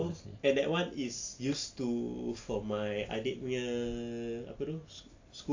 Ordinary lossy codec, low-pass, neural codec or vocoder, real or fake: AAC, 48 kbps; 7.2 kHz; none; real